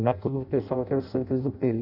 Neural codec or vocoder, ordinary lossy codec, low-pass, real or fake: codec, 16 kHz in and 24 kHz out, 0.6 kbps, FireRedTTS-2 codec; none; 5.4 kHz; fake